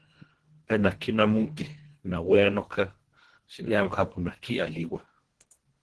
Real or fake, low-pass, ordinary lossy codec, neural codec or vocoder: fake; 10.8 kHz; Opus, 16 kbps; codec, 24 kHz, 1.5 kbps, HILCodec